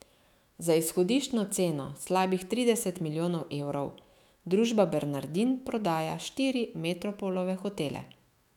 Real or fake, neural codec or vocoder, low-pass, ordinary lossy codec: fake; autoencoder, 48 kHz, 128 numbers a frame, DAC-VAE, trained on Japanese speech; 19.8 kHz; none